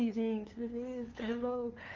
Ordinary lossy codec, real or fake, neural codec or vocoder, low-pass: Opus, 32 kbps; fake; codec, 16 kHz, 2 kbps, FunCodec, trained on LibriTTS, 25 frames a second; 7.2 kHz